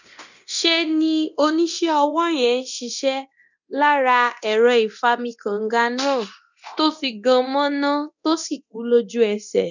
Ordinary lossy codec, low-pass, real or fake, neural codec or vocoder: none; 7.2 kHz; fake; codec, 24 kHz, 0.9 kbps, DualCodec